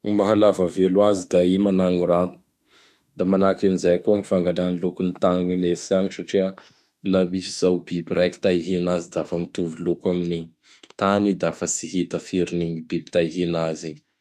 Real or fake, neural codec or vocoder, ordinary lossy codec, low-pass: fake; autoencoder, 48 kHz, 32 numbers a frame, DAC-VAE, trained on Japanese speech; none; 14.4 kHz